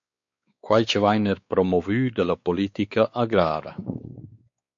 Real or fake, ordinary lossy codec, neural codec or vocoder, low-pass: fake; MP3, 48 kbps; codec, 16 kHz, 4 kbps, X-Codec, WavLM features, trained on Multilingual LibriSpeech; 7.2 kHz